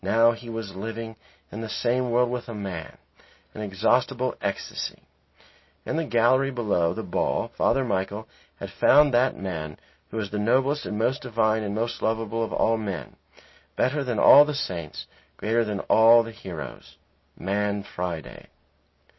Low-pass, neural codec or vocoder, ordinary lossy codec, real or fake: 7.2 kHz; none; MP3, 24 kbps; real